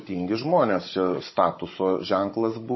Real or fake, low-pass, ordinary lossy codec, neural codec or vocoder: fake; 7.2 kHz; MP3, 24 kbps; codec, 16 kHz, 16 kbps, FunCodec, trained on Chinese and English, 50 frames a second